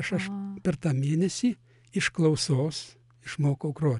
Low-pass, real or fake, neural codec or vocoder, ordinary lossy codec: 10.8 kHz; real; none; MP3, 96 kbps